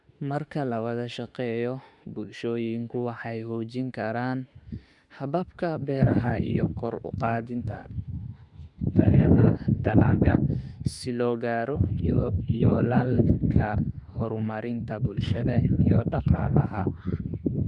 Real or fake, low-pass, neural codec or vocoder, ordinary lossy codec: fake; 10.8 kHz; autoencoder, 48 kHz, 32 numbers a frame, DAC-VAE, trained on Japanese speech; Opus, 64 kbps